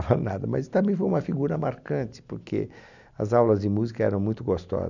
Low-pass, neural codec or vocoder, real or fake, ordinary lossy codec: 7.2 kHz; none; real; none